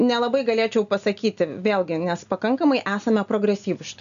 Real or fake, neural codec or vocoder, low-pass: real; none; 7.2 kHz